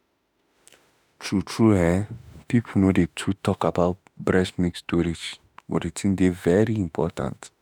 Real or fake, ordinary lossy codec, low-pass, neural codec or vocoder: fake; none; none; autoencoder, 48 kHz, 32 numbers a frame, DAC-VAE, trained on Japanese speech